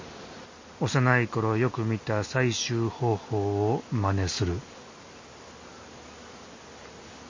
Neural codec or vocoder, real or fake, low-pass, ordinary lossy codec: none; real; 7.2 kHz; MP3, 32 kbps